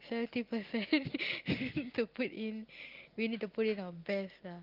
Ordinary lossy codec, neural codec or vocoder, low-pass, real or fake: Opus, 24 kbps; none; 5.4 kHz; real